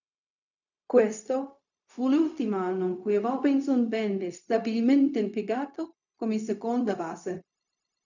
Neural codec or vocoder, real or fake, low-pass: codec, 16 kHz, 0.4 kbps, LongCat-Audio-Codec; fake; 7.2 kHz